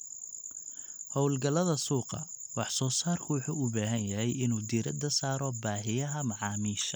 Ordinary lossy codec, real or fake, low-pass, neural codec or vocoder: none; real; none; none